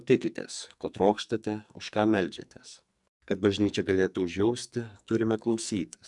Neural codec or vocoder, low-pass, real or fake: codec, 44.1 kHz, 2.6 kbps, SNAC; 10.8 kHz; fake